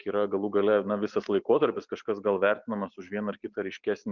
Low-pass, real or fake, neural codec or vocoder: 7.2 kHz; real; none